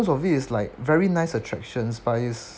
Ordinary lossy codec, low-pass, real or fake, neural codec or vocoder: none; none; real; none